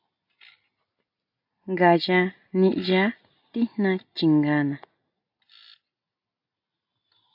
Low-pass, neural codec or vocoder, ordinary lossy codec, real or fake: 5.4 kHz; none; AAC, 48 kbps; real